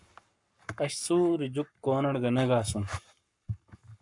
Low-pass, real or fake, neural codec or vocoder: 10.8 kHz; fake; codec, 44.1 kHz, 7.8 kbps, Pupu-Codec